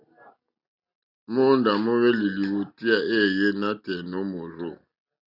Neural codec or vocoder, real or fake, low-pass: none; real; 5.4 kHz